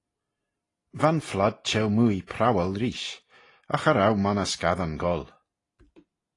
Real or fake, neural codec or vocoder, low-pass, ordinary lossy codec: real; none; 10.8 kHz; AAC, 32 kbps